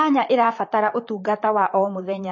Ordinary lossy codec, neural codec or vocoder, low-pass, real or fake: MP3, 32 kbps; vocoder, 44.1 kHz, 128 mel bands, Pupu-Vocoder; 7.2 kHz; fake